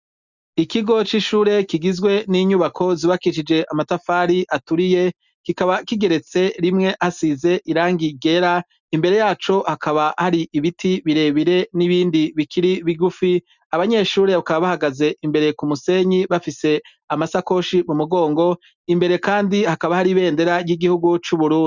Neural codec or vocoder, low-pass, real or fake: none; 7.2 kHz; real